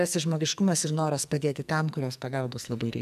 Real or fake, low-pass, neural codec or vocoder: fake; 14.4 kHz; codec, 44.1 kHz, 2.6 kbps, SNAC